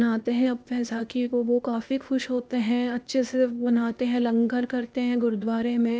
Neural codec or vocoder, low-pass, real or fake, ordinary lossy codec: codec, 16 kHz, 0.8 kbps, ZipCodec; none; fake; none